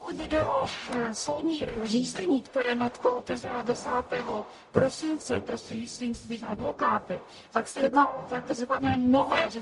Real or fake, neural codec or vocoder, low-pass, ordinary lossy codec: fake; codec, 44.1 kHz, 0.9 kbps, DAC; 14.4 kHz; MP3, 48 kbps